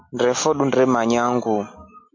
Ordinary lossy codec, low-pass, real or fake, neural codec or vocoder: MP3, 48 kbps; 7.2 kHz; real; none